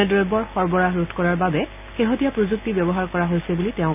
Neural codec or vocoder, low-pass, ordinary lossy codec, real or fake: none; 3.6 kHz; none; real